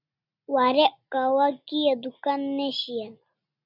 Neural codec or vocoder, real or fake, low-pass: none; real; 5.4 kHz